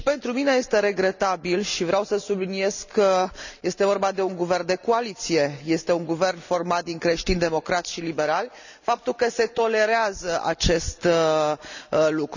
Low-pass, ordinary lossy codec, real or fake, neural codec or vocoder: 7.2 kHz; none; real; none